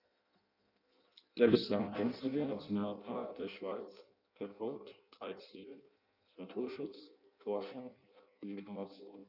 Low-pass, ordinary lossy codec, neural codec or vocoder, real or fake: 5.4 kHz; none; codec, 16 kHz in and 24 kHz out, 0.6 kbps, FireRedTTS-2 codec; fake